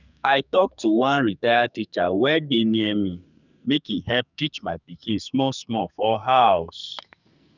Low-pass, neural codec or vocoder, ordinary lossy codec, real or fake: 7.2 kHz; codec, 44.1 kHz, 2.6 kbps, SNAC; none; fake